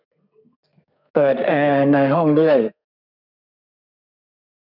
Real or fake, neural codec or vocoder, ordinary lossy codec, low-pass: fake; codec, 32 kHz, 1.9 kbps, SNAC; none; 5.4 kHz